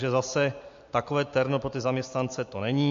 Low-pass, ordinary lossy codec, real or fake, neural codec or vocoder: 7.2 kHz; MP3, 48 kbps; real; none